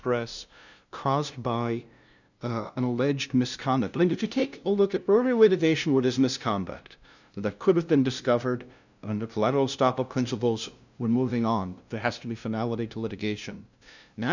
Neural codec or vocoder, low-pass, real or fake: codec, 16 kHz, 0.5 kbps, FunCodec, trained on LibriTTS, 25 frames a second; 7.2 kHz; fake